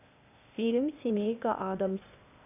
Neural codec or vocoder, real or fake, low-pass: codec, 16 kHz, 0.8 kbps, ZipCodec; fake; 3.6 kHz